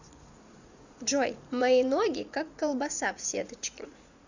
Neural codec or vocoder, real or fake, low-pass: vocoder, 44.1 kHz, 80 mel bands, Vocos; fake; 7.2 kHz